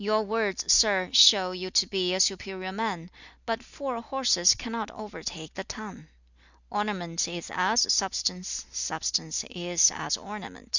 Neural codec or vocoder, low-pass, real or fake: none; 7.2 kHz; real